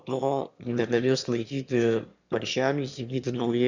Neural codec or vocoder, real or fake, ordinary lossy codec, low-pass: autoencoder, 22.05 kHz, a latent of 192 numbers a frame, VITS, trained on one speaker; fake; Opus, 64 kbps; 7.2 kHz